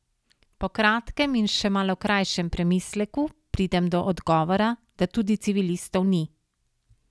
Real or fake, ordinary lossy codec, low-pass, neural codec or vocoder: real; none; none; none